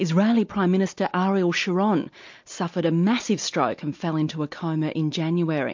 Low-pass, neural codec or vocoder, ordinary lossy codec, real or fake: 7.2 kHz; none; MP3, 48 kbps; real